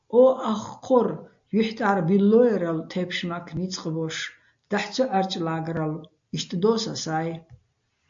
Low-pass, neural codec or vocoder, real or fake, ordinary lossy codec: 7.2 kHz; none; real; AAC, 64 kbps